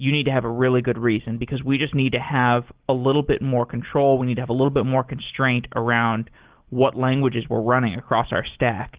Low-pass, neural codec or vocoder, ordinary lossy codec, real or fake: 3.6 kHz; none; Opus, 16 kbps; real